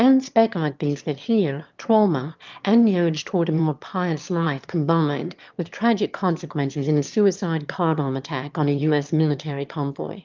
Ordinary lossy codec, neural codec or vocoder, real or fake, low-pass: Opus, 24 kbps; autoencoder, 22.05 kHz, a latent of 192 numbers a frame, VITS, trained on one speaker; fake; 7.2 kHz